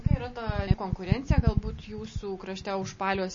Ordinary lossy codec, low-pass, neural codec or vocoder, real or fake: MP3, 32 kbps; 7.2 kHz; none; real